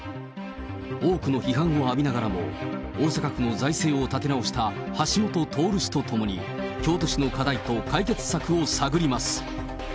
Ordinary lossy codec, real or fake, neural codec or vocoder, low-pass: none; real; none; none